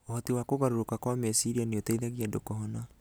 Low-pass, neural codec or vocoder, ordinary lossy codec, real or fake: none; none; none; real